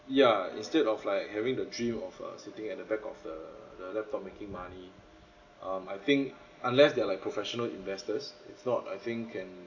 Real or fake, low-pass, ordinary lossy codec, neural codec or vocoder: real; 7.2 kHz; none; none